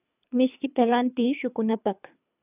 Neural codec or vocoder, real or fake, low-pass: codec, 24 kHz, 3 kbps, HILCodec; fake; 3.6 kHz